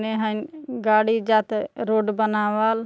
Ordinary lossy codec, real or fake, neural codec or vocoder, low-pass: none; real; none; none